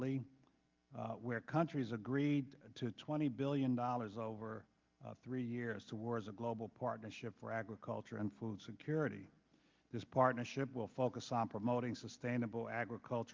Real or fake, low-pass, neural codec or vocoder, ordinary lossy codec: real; 7.2 kHz; none; Opus, 24 kbps